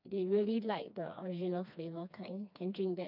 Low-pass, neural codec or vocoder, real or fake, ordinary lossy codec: 5.4 kHz; codec, 16 kHz, 2 kbps, FreqCodec, smaller model; fake; none